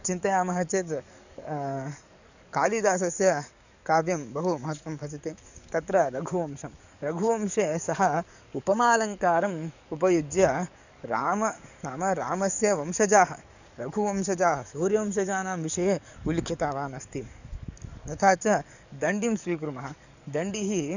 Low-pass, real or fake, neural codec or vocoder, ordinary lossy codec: 7.2 kHz; fake; codec, 44.1 kHz, 7.8 kbps, DAC; none